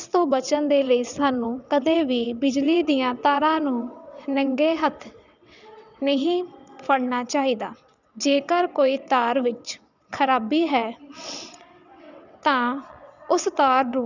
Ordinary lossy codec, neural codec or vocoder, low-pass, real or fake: none; vocoder, 22.05 kHz, 80 mel bands, WaveNeXt; 7.2 kHz; fake